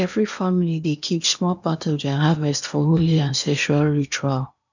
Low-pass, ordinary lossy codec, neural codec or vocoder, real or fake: 7.2 kHz; none; codec, 16 kHz in and 24 kHz out, 0.8 kbps, FocalCodec, streaming, 65536 codes; fake